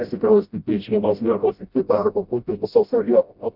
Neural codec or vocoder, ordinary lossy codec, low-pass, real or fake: codec, 16 kHz, 0.5 kbps, FreqCodec, smaller model; Opus, 64 kbps; 5.4 kHz; fake